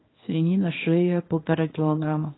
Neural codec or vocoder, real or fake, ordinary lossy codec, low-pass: codec, 24 kHz, 0.9 kbps, WavTokenizer, small release; fake; AAC, 16 kbps; 7.2 kHz